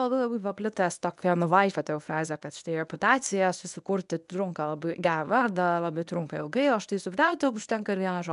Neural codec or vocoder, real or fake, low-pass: codec, 24 kHz, 0.9 kbps, WavTokenizer, medium speech release version 1; fake; 10.8 kHz